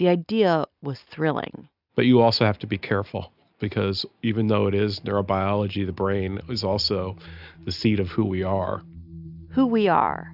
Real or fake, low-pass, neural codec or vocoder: real; 5.4 kHz; none